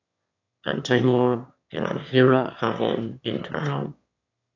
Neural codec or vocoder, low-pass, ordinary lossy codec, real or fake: autoencoder, 22.05 kHz, a latent of 192 numbers a frame, VITS, trained on one speaker; 7.2 kHz; AAC, 48 kbps; fake